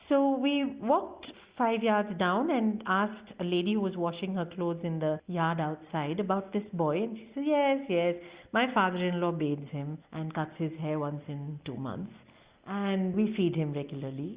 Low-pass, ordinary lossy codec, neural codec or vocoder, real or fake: 3.6 kHz; Opus, 64 kbps; none; real